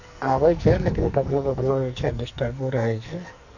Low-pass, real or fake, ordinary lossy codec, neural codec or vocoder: 7.2 kHz; fake; none; codec, 44.1 kHz, 2.6 kbps, SNAC